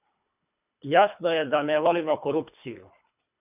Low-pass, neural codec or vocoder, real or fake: 3.6 kHz; codec, 24 kHz, 3 kbps, HILCodec; fake